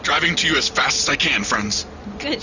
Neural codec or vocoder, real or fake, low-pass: none; real; 7.2 kHz